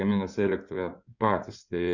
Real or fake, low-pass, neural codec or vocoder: fake; 7.2 kHz; codec, 16 kHz in and 24 kHz out, 2.2 kbps, FireRedTTS-2 codec